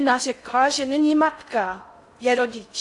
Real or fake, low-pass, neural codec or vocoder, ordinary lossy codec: fake; 10.8 kHz; codec, 16 kHz in and 24 kHz out, 0.6 kbps, FocalCodec, streaming, 4096 codes; AAC, 48 kbps